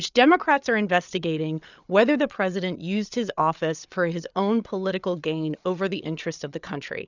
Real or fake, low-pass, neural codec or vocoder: fake; 7.2 kHz; codec, 16 kHz, 8 kbps, FreqCodec, larger model